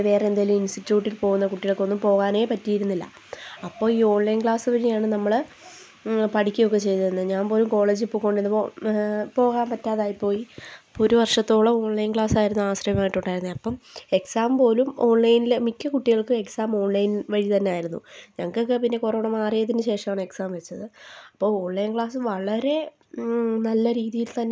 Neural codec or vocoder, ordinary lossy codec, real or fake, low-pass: none; none; real; none